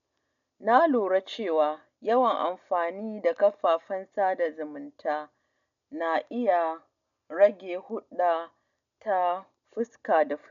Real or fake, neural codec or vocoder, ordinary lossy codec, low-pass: real; none; none; 7.2 kHz